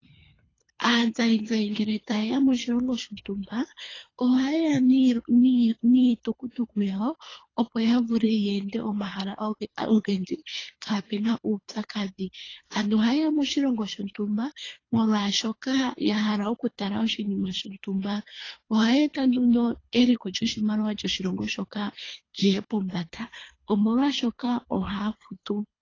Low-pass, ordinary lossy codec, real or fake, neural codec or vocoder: 7.2 kHz; AAC, 32 kbps; fake; codec, 24 kHz, 3 kbps, HILCodec